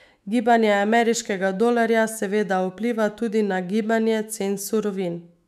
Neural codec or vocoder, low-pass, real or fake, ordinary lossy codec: autoencoder, 48 kHz, 128 numbers a frame, DAC-VAE, trained on Japanese speech; 14.4 kHz; fake; none